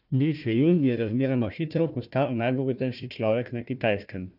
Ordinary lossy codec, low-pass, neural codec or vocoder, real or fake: none; 5.4 kHz; codec, 16 kHz, 1 kbps, FunCodec, trained on Chinese and English, 50 frames a second; fake